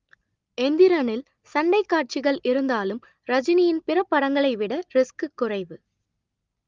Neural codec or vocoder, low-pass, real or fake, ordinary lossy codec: none; 7.2 kHz; real; Opus, 32 kbps